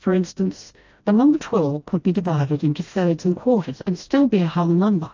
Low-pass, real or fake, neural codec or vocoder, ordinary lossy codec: 7.2 kHz; fake; codec, 16 kHz, 1 kbps, FreqCodec, smaller model; AAC, 48 kbps